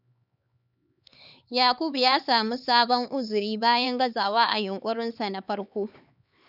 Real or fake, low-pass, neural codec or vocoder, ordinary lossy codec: fake; 5.4 kHz; codec, 16 kHz, 4 kbps, X-Codec, HuBERT features, trained on LibriSpeech; none